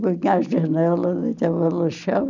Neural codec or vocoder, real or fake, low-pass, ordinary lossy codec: none; real; 7.2 kHz; none